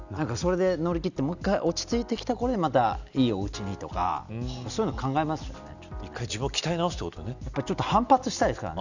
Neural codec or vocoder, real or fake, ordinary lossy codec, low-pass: none; real; none; 7.2 kHz